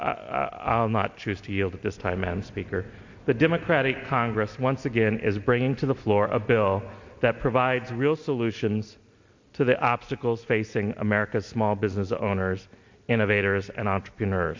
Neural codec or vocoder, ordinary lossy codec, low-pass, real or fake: none; MP3, 48 kbps; 7.2 kHz; real